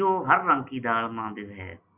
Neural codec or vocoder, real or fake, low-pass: none; real; 3.6 kHz